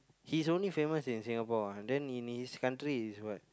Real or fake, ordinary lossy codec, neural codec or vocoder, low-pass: real; none; none; none